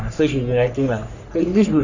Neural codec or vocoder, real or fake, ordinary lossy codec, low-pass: codec, 44.1 kHz, 3.4 kbps, Pupu-Codec; fake; none; 7.2 kHz